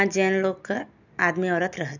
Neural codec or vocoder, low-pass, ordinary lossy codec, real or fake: none; 7.2 kHz; none; real